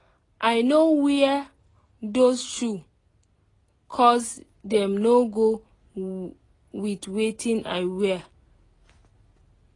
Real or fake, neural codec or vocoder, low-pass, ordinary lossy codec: real; none; 10.8 kHz; AAC, 32 kbps